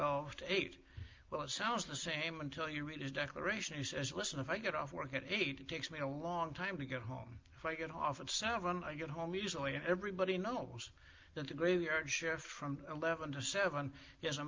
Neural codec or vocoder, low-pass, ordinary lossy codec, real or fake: none; 7.2 kHz; Opus, 32 kbps; real